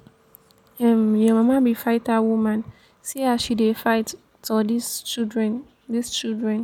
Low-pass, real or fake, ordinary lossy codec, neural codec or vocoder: 19.8 kHz; real; none; none